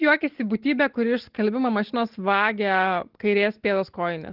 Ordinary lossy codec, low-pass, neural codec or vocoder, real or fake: Opus, 16 kbps; 5.4 kHz; none; real